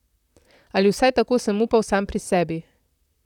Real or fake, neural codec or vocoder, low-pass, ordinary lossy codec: fake; vocoder, 44.1 kHz, 128 mel bands, Pupu-Vocoder; 19.8 kHz; none